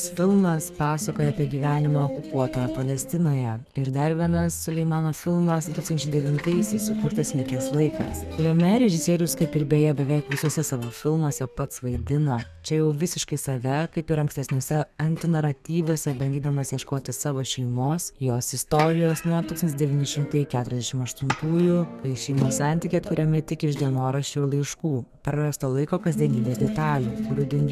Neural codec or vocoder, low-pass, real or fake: codec, 32 kHz, 1.9 kbps, SNAC; 14.4 kHz; fake